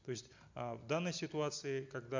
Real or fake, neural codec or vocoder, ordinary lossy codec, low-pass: real; none; MP3, 64 kbps; 7.2 kHz